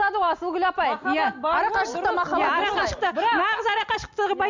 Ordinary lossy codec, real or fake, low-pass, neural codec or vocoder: MP3, 64 kbps; real; 7.2 kHz; none